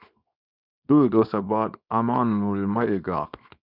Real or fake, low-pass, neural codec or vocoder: fake; 5.4 kHz; codec, 24 kHz, 0.9 kbps, WavTokenizer, small release